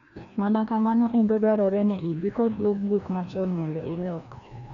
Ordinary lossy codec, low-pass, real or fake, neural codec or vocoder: none; 7.2 kHz; fake; codec, 16 kHz, 1 kbps, FreqCodec, larger model